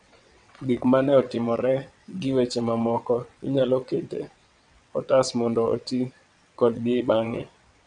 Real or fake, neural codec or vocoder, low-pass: fake; vocoder, 22.05 kHz, 80 mel bands, WaveNeXt; 9.9 kHz